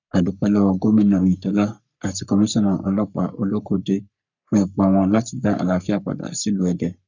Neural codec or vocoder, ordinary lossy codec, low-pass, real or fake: codec, 44.1 kHz, 3.4 kbps, Pupu-Codec; none; 7.2 kHz; fake